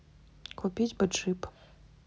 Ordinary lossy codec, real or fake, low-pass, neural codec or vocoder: none; real; none; none